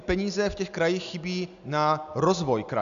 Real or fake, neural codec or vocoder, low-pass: real; none; 7.2 kHz